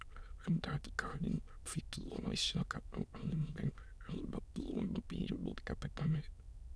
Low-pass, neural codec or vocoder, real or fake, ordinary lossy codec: none; autoencoder, 22.05 kHz, a latent of 192 numbers a frame, VITS, trained on many speakers; fake; none